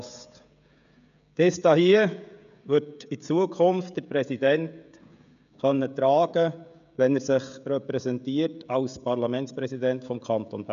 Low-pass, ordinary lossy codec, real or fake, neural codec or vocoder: 7.2 kHz; none; fake; codec, 16 kHz, 16 kbps, FreqCodec, smaller model